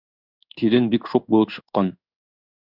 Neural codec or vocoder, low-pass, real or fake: codec, 16 kHz in and 24 kHz out, 1 kbps, XY-Tokenizer; 5.4 kHz; fake